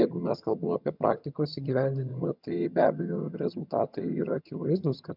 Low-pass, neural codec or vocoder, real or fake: 5.4 kHz; vocoder, 22.05 kHz, 80 mel bands, HiFi-GAN; fake